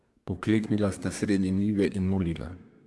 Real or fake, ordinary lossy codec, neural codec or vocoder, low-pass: fake; none; codec, 24 kHz, 1 kbps, SNAC; none